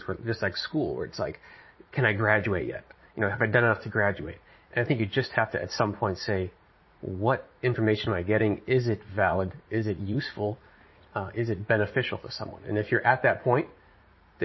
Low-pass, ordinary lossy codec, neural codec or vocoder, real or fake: 7.2 kHz; MP3, 24 kbps; vocoder, 44.1 kHz, 80 mel bands, Vocos; fake